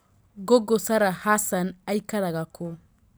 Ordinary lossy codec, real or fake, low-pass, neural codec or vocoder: none; real; none; none